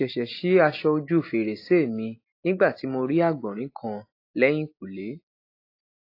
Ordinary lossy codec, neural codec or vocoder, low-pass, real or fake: AAC, 32 kbps; none; 5.4 kHz; real